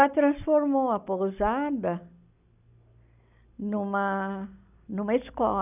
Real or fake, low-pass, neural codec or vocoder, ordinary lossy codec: real; 3.6 kHz; none; none